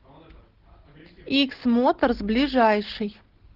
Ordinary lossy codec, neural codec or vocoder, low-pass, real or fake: Opus, 16 kbps; none; 5.4 kHz; real